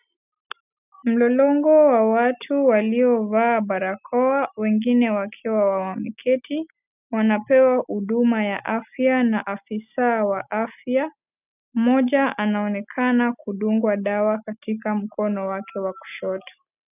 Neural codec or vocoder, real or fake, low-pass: none; real; 3.6 kHz